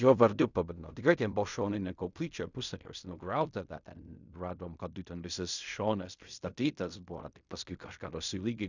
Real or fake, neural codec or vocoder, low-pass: fake; codec, 16 kHz in and 24 kHz out, 0.4 kbps, LongCat-Audio-Codec, fine tuned four codebook decoder; 7.2 kHz